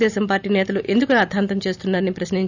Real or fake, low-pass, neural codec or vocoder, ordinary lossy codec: real; 7.2 kHz; none; none